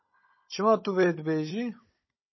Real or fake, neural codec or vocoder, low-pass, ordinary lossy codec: fake; codec, 16 kHz, 16 kbps, FunCodec, trained on Chinese and English, 50 frames a second; 7.2 kHz; MP3, 24 kbps